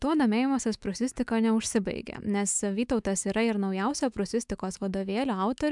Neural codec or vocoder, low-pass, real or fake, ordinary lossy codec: autoencoder, 48 kHz, 128 numbers a frame, DAC-VAE, trained on Japanese speech; 10.8 kHz; fake; MP3, 96 kbps